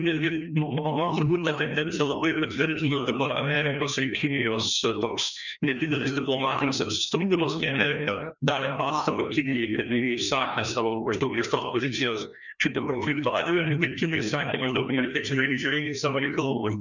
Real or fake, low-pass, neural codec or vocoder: fake; 7.2 kHz; codec, 16 kHz, 1 kbps, FreqCodec, larger model